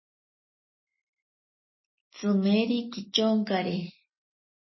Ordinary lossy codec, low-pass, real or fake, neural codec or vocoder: MP3, 24 kbps; 7.2 kHz; real; none